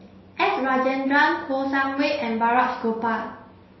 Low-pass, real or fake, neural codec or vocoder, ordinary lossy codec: 7.2 kHz; real; none; MP3, 24 kbps